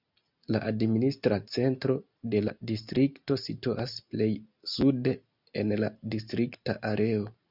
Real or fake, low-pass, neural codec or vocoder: real; 5.4 kHz; none